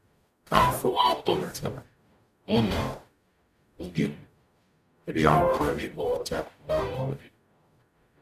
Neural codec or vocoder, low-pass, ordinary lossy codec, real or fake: codec, 44.1 kHz, 0.9 kbps, DAC; 14.4 kHz; none; fake